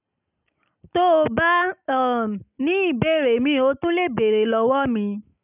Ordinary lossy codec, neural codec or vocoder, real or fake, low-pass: none; none; real; 3.6 kHz